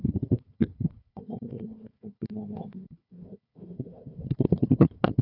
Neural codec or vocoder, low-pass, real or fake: codec, 16 kHz, 1 kbps, FunCodec, trained on Chinese and English, 50 frames a second; 5.4 kHz; fake